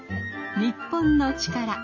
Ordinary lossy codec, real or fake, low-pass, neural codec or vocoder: MP3, 32 kbps; real; 7.2 kHz; none